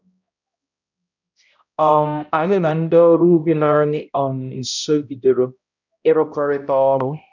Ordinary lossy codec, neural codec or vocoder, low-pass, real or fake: none; codec, 16 kHz, 0.5 kbps, X-Codec, HuBERT features, trained on balanced general audio; 7.2 kHz; fake